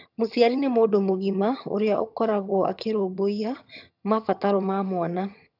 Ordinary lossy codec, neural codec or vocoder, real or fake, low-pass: none; vocoder, 22.05 kHz, 80 mel bands, HiFi-GAN; fake; 5.4 kHz